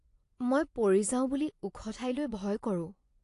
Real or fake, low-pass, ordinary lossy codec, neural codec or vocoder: fake; 10.8 kHz; AAC, 48 kbps; vocoder, 24 kHz, 100 mel bands, Vocos